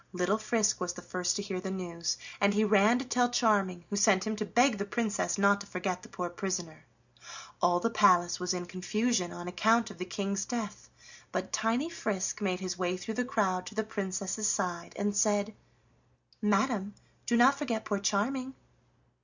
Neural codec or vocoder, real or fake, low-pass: none; real; 7.2 kHz